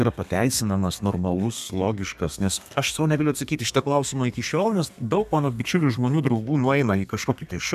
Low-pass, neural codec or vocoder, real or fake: 14.4 kHz; codec, 32 kHz, 1.9 kbps, SNAC; fake